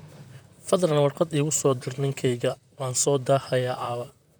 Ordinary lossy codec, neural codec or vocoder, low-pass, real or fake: none; vocoder, 44.1 kHz, 128 mel bands, Pupu-Vocoder; none; fake